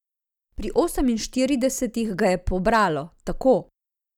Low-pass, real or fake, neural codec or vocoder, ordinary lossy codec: 19.8 kHz; real; none; none